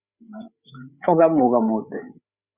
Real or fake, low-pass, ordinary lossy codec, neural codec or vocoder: fake; 3.6 kHz; Opus, 64 kbps; codec, 16 kHz, 8 kbps, FreqCodec, larger model